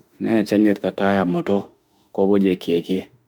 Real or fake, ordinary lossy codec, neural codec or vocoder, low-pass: fake; none; autoencoder, 48 kHz, 32 numbers a frame, DAC-VAE, trained on Japanese speech; none